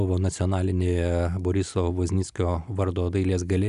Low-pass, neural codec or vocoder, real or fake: 10.8 kHz; none; real